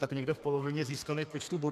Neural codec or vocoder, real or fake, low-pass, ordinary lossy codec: codec, 32 kHz, 1.9 kbps, SNAC; fake; 14.4 kHz; MP3, 96 kbps